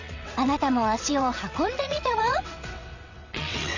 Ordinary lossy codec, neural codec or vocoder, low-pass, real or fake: none; vocoder, 22.05 kHz, 80 mel bands, WaveNeXt; 7.2 kHz; fake